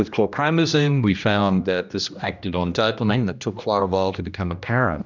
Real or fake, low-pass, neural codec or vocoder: fake; 7.2 kHz; codec, 16 kHz, 1 kbps, X-Codec, HuBERT features, trained on general audio